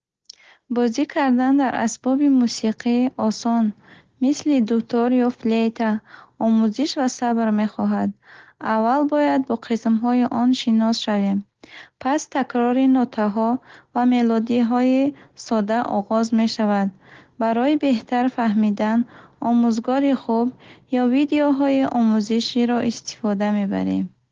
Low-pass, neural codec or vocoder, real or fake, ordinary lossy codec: 7.2 kHz; none; real; Opus, 16 kbps